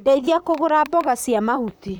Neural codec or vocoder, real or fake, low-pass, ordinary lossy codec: vocoder, 44.1 kHz, 128 mel bands, Pupu-Vocoder; fake; none; none